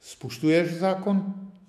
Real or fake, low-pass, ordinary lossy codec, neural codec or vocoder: fake; 14.4 kHz; MP3, 64 kbps; autoencoder, 48 kHz, 128 numbers a frame, DAC-VAE, trained on Japanese speech